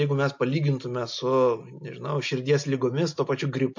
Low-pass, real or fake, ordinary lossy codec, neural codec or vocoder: 7.2 kHz; real; MP3, 48 kbps; none